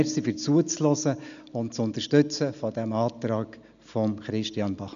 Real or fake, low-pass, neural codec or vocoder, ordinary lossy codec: real; 7.2 kHz; none; none